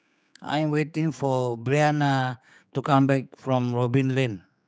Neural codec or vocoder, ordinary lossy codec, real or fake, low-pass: codec, 16 kHz, 4 kbps, X-Codec, HuBERT features, trained on general audio; none; fake; none